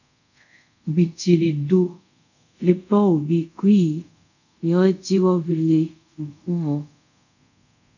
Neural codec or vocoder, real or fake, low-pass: codec, 24 kHz, 0.5 kbps, DualCodec; fake; 7.2 kHz